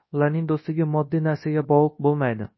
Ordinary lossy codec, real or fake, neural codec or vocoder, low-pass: MP3, 24 kbps; fake; codec, 24 kHz, 0.9 kbps, WavTokenizer, large speech release; 7.2 kHz